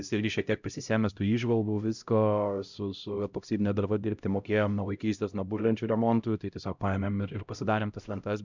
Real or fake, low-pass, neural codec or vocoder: fake; 7.2 kHz; codec, 16 kHz, 0.5 kbps, X-Codec, HuBERT features, trained on LibriSpeech